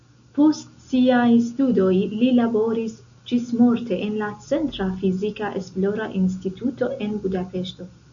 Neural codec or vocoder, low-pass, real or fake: none; 7.2 kHz; real